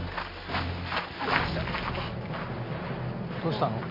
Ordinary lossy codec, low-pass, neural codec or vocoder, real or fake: MP3, 32 kbps; 5.4 kHz; none; real